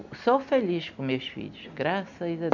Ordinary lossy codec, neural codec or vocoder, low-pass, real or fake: none; none; 7.2 kHz; real